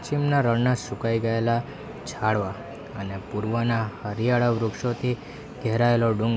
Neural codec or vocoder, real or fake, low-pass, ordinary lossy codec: none; real; none; none